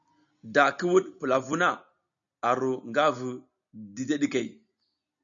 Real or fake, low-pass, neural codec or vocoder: real; 7.2 kHz; none